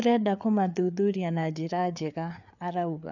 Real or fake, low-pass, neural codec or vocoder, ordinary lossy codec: fake; 7.2 kHz; codec, 16 kHz, 4 kbps, FreqCodec, larger model; none